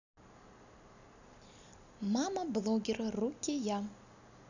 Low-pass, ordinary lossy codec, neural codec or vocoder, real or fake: 7.2 kHz; none; none; real